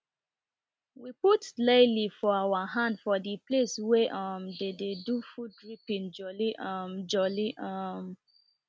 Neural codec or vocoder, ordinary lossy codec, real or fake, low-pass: none; none; real; none